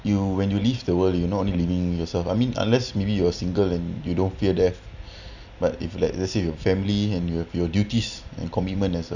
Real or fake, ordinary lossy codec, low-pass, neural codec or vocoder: real; none; 7.2 kHz; none